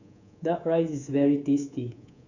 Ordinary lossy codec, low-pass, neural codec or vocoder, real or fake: none; 7.2 kHz; codec, 24 kHz, 3.1 kbps, DualCodec; fake